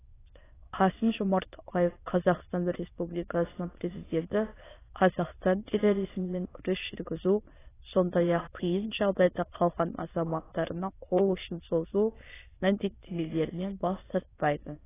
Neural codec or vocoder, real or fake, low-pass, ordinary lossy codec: autoencoder, 22.05 kHz, a latent of 192 numbers a frame, VITS, trained on many speakers; fake; 3.6 kHz; AAC, 16 kbps